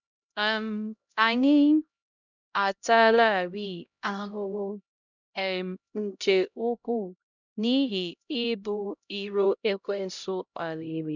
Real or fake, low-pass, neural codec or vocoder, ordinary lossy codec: fake; 7.2 kHz; codec, 16 kHz, 0.5 kbps, X-Codec, HuBERT features, trained on LibriSpeech; none